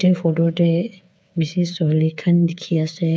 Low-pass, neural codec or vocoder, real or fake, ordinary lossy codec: none; codec, 16 kHz, 8 kbps, FreqCodec, smaller model; fake; none